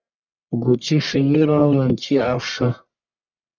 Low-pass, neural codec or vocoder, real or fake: 7.2 kHz; codec, 44.1 kHz, 1.7 kbps, Pupu-Codec; fake